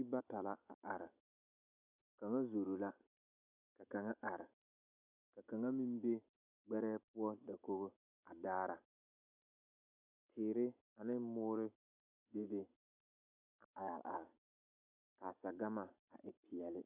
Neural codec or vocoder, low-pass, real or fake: none; 3.6 kHz; real